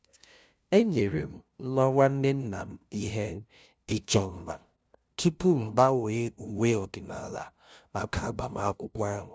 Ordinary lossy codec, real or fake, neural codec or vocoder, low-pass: none; fake; codec, 16 kHz, 0.5 kbps, FunCodec, trained on LibriTTS, 25 frames a second; none